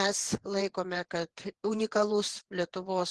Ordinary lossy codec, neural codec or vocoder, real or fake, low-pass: Opus, 16 kbps; vocoder, 48 kHz, 128 mel bands, Vocos; fake; 10.8 kHz